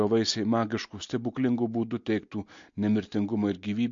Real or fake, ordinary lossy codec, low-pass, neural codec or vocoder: real; MP3, 48 kbps; 7.2 kHz; none